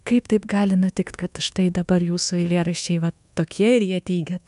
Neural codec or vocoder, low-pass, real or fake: codec, 24 kHz, 1.2 kbps, DualCodec; 10.8 kHz; fake